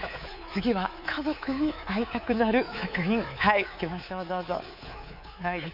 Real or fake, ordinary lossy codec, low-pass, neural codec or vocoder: fake; none; 5.4 kHz; codec, 24 kHz, 3.1 kbps, DualCodec